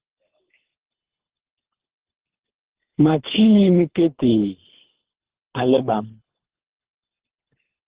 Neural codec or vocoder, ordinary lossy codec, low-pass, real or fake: codec, 24 kHz, 3 kbps, HILCodec; Opus, 16 kbps; 3.6 kHz; fake